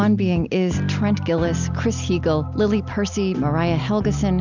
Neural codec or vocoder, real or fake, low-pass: none; real; 7.2 kHz